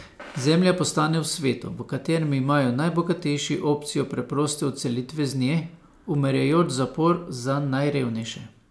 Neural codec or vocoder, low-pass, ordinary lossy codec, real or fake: none; none; none; real